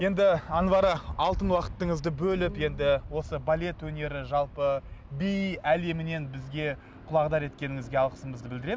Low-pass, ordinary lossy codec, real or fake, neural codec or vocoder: none; none; real; none